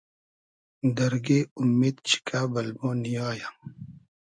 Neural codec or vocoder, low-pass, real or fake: none; 9.9 kHz; real